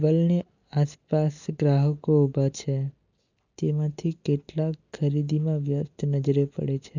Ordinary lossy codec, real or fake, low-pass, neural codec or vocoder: none; real; 7.2 kHz; none